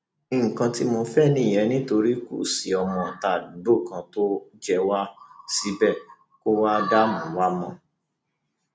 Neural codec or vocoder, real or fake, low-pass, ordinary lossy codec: none; real; none; none